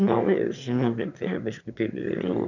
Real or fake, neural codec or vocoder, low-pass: fake; autoencoder, 22.05 kHz, a latent of 192 numbers a frame, VITS, trained on one speaker; 7.2 kHz